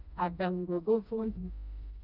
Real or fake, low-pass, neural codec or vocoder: fake; 5.4 kHz; codec, 16 kHz, 1 kbps, FreqCodec, smaller model